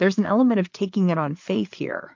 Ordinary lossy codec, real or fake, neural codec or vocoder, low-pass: MP3, 48 kbps; fake; codec, 16 kHz, 4 kbps, FreqCodec, larger model; 7.2 kHz